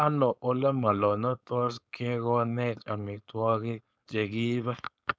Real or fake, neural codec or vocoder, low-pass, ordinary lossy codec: fake; codec, 16 kHz, 4.8 kbps, FACodec; none; none